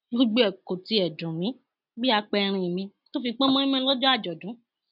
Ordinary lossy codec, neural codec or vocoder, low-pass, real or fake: none; none; 5.4 kHz; real